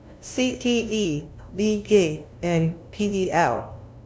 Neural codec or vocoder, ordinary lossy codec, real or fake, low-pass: codec, 16 kHz, 0.5 kbps, FunCodec, trained on LibriTTS, 25 frames a second; none; fake; none